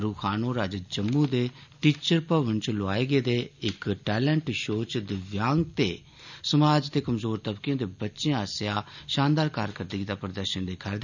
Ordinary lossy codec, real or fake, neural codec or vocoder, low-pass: none; real; none; 7.2 kHz